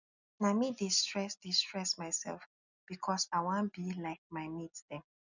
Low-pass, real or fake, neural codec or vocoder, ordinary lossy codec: 7.2 kHz; real; none; none